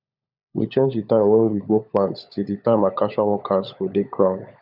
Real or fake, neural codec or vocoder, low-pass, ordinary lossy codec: fake; codec, 16 kHz, 16 kbps, FunCodec, trained on LibriTTS, 50 frames a second; 5.4 kHz; none